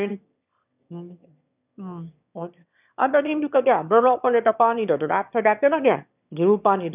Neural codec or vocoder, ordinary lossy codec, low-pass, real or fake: autoencoder, 22.05 kHz, a latent of 192 numbers a frame, VITS, trained on one speaker; none; 3.6 kHz; fake